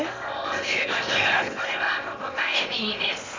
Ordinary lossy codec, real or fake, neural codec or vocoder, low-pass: MP3, 64 kbps; fake; codec, 16 kHz in and 24 kHz out, 0.8 kbps, FocalCodec, streaming, 65536 codes; 7.2 kHz